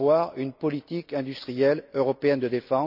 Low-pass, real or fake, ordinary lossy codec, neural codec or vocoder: 5.4 kHz; real; none; none